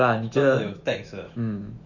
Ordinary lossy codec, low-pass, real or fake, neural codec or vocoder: none; 7.2 kHz; fake; autoencoder, 48 kHz, 128 numbers a frame, DAC-VAE, trained on Japanese speech